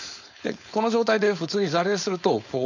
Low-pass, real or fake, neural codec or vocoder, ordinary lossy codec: 7.2 kHz; fake; codec, 16 kHz, 4.8 kbps, FACodec; none